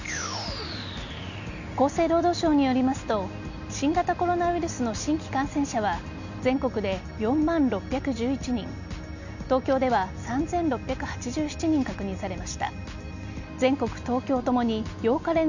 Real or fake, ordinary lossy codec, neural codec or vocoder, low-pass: real; none; none; 7.2 kHz